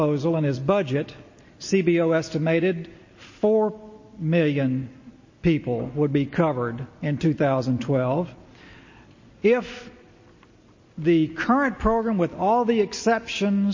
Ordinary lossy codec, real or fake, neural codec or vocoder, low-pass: MP3, 32 kbps; real; none; 7.2 kHz